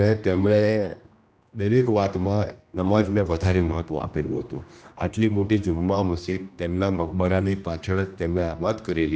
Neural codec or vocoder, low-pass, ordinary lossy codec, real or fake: codec, 16 kHz, 1 kbps, X-Codec, HuBERT features, trained on general audio; none; none; fake